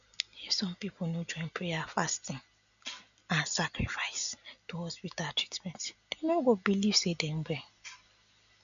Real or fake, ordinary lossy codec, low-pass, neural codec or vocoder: real; none; 7.2 kHz; none